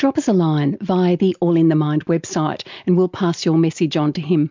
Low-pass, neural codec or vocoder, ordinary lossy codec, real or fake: 7.2 kHz; none; MP3, 64 kbps; real